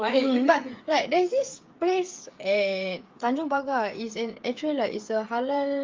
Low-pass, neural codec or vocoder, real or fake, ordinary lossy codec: 7.2 kHz; codec, 16 kHz, 8 kbps, FreqCodec, smaller model; fake; Opus, 32 kbps